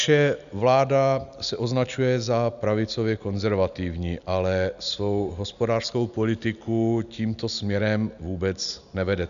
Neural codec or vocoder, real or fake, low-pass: none; real; 7.2 kHz